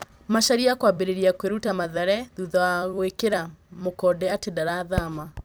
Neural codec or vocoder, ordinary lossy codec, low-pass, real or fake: vocoder, 44.1 kHz, 128 mel bands every 256 samples, BigVGAN v2; none; none; fake